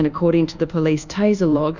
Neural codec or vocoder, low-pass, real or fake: codec, 24 kHz, 1.2 kbps, DualCodec; 7.2 kHz; fake